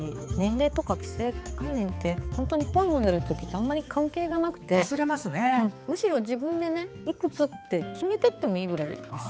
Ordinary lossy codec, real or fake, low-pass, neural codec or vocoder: none; fake; none; codec, 16 kHz, 4 kbps, X-Codec, HuBERT features, trained on balanced general audio